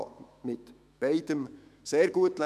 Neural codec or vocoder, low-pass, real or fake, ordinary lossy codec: autoencoder, 48 kHz, 128 numbers a frame, DAC-VAE, trained on Japanese speech; 14.4 kHz; fake; MP3, 96 kbps